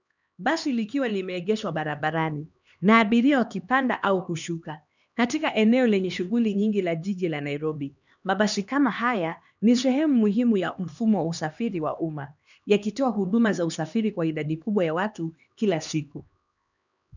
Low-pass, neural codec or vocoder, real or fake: 7.2 kHz; codec, 16 kHz, 2 kbps, X-Codec, HuBERT features, trained on LibriSpeech; fake